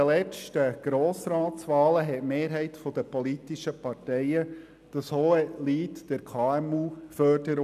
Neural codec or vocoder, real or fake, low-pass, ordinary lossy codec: none; real; 14.4 kHz; none